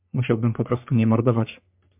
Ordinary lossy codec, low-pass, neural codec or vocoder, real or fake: MP3, 32 kbps; 3.6 kHz; codec, 44.1 kHz, 3.4 kbps, Pupu-Codec; fake